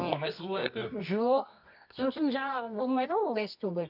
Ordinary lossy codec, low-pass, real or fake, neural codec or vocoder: none; 5.4 kHz; fake; codec, 24 kHz, 0.9 kbps, WavTokenizer, medium music audio release